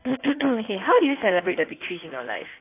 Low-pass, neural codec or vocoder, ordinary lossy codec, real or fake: 3.6 kHz; codec, 16 kHz in and 24 kHz out, 1.1 kbps, FireRedTTS-2 codec; AAC, 24 kbps; fake